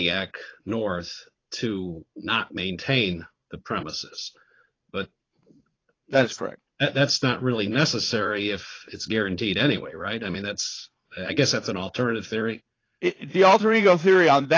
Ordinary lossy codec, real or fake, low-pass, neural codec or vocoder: AAC, 32 kbps; fake; 7.2 kHz; vocoder, 44.1 kHz, 80 mel bands, Vocos